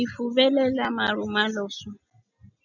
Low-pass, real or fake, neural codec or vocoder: 7.2 kHz; real; none